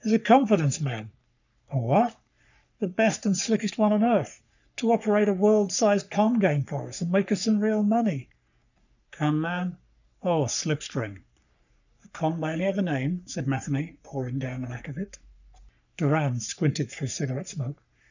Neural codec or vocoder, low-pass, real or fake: codec, 44.1 kHz, 3.4 kbps, Pupu-Codec; 7.2 kHz; fake